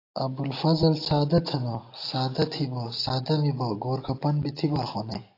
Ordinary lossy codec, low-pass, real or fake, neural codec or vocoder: AAC, 32 kbps; 5.4 kHz; real; none